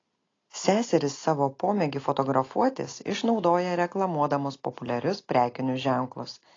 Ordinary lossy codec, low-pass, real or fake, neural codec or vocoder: AAC, 32 kbps; 7.2 kHz; real; none